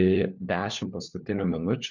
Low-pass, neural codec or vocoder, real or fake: 7.2 kHz; codec, 16 kHz, 4 kbps, FunCodec, trained on LibriTTS, 50 frames a second; fake